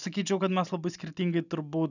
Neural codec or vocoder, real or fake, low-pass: none; real; 7.2 kHz